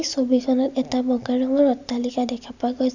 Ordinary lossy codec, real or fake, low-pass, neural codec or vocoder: none; real; 7.2 kHz; none